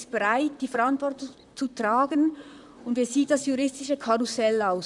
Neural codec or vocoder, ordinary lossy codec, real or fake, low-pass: vocoder, 44.1 kHz, 128 mel bands, Pupu-Vocoder; none; fake; 10.8 kHz